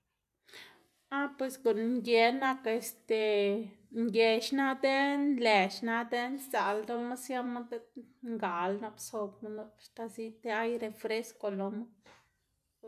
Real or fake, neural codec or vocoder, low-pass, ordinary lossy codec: real; none; 14.4 kHz; none